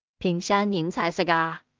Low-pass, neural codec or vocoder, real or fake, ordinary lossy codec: 7.2 kHz; codec, 16 kHz in and 24 kHz out, 0.4 kbps, LongCat-Audio-Codec, two codebook decoder; fake; Opus, 24 kbps